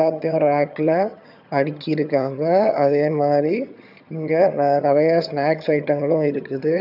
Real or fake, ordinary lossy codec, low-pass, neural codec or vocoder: fake; AAC, 48 kbps; 5.4 kHz; vocoder, 22.05 kHz, 80 mel bands, HiFi-GAN